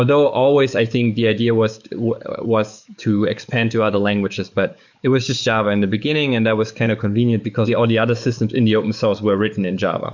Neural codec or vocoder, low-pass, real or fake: codec, 44.1 kHz, 7.8 kbps, DAC; 7.2 kHz; fake